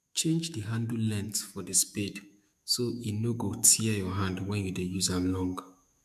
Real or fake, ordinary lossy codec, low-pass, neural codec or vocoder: fake; MP3, 96 kbps; 14.4 kHz; autoencoder, 48 kHz, 128 numbers a frame, DAC-VAE, trained on Japanese speech